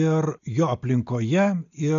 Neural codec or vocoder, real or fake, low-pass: none; real; 7.2 kHz